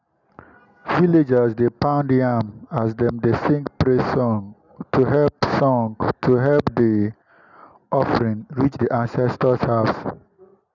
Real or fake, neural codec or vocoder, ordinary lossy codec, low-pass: real; none; none; 7.2 kHz